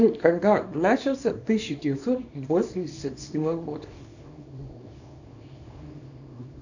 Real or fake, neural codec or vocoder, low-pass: fake; codec, 24 kHz, 0.9 kbps, WavTokenizer, small release; 7.2 kHz